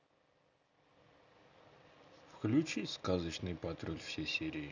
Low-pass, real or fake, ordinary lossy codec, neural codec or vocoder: 7.2 kHz; real; none; none